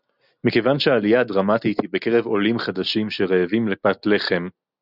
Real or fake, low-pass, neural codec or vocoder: real; 5.4 kHz; none